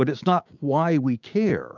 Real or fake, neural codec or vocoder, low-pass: fake; codec, 16 kHz, 6 kbps, DAC; 7.2 kHz